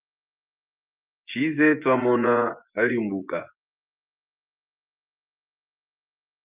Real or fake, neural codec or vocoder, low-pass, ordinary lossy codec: fake; vocoder, 24 kHz, 100 mel bands, Vocos; 3.6 kHz; Opus, 32 kbps